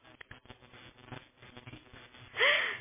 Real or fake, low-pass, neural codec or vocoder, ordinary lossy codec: real; 3.6 kHz; none; MP3, 16 kbps